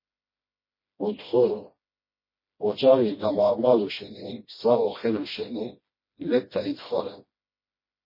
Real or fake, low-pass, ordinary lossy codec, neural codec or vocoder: fake; 5.4 kHz; MP3, 24 kbps; codec, 16 kHz, 1 kbps, FreqCodec, smaller model